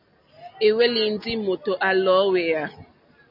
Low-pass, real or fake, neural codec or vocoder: 5.4 kHz; real; none